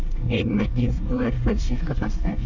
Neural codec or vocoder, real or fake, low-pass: codec, 24 kHz, 1 kbps, SNAC; fake; 7.2 kHz